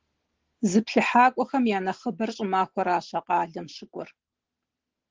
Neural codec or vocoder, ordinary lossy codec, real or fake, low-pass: none; Opus, 16 kbps; real; 7.2 kHz